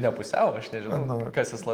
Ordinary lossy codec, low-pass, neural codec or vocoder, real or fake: Opus, 24 kbps; 19.8 kHz; none; real